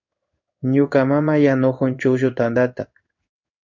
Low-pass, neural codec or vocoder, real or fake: 7.2 kHz; codec, 16 kHz in and 24 kHz out, 1 kbps, XY-Tokenizer; fake